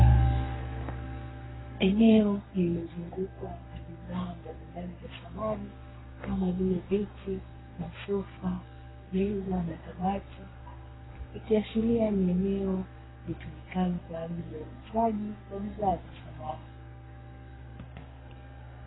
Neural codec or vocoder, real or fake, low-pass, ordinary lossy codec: codec, 32 kHz, 1.9 kbps, SNAC; fake; 7.2 kHz; AAC, 16 kbps